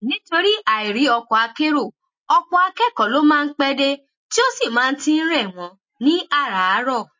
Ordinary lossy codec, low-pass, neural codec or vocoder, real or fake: MP3, 32 kbps; 7.2 kHz; none; real